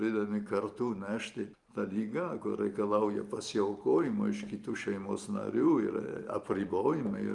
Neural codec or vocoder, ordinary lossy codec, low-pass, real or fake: vocoder, 44.1 kHz, 128 mel bands every 512 samples, BigVGAN v2; Opus, 32 kbps; 10.8 kHz; fake